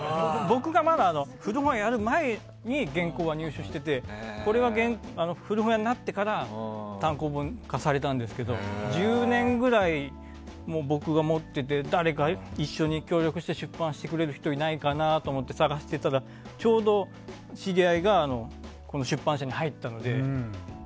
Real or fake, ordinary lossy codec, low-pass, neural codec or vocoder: real; none; none; none